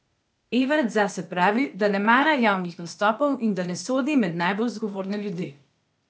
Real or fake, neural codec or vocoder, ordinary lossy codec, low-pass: fake; codec, 16 kHz, 0.8 kbps, ZipCodec; none; none